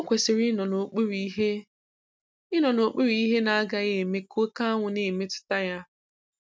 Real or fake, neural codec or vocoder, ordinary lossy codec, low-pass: real; none; none; none